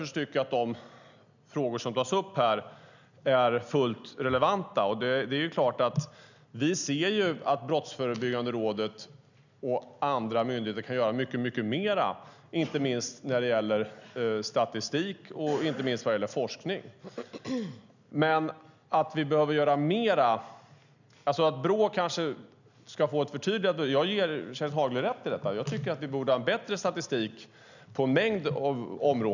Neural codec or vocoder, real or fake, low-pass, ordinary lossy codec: none; real; 7.2 kHz; none